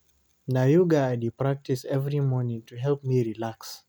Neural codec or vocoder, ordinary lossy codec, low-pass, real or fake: none; none; none; real